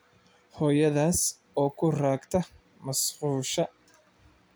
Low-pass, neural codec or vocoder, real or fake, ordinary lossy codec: none; none; real; none